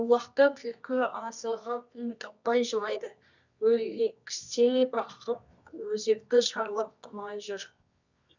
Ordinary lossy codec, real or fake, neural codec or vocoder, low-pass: none; fake; codec, 24 kHz, 0.9 kbps, WavTokenizer, medium music audio release; 7.2 kHz